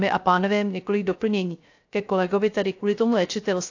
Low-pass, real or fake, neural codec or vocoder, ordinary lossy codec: 7.2 kHz; fake; codec, 16 kHz, 0.3 kbps, FocalCodec; AAC, 48 kbps